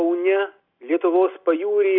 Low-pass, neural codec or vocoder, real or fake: 5.4 kHz; none; real